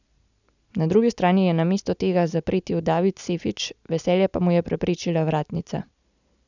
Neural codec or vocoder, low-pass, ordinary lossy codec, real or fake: none; 7.2 kHz; none; real